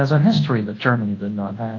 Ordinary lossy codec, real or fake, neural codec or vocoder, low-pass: AAC, 32 kbps; fake; codec, 24 kHz, 0.9 kbps, WavTokenizer, large speech release; 7.2 kHz